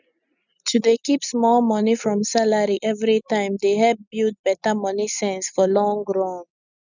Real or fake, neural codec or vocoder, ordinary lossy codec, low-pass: real; none; none; 7.2 kHz